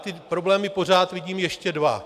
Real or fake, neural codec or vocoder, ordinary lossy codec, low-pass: real; none; AAC, 96 kbps; 14.4 kHz